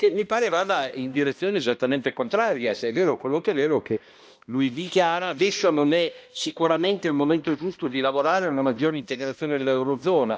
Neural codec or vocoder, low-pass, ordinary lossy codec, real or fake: codec, 16 kHz, 1 kbps, X-Codec, HuBERT features, trained on balanced general audio; none; none; fake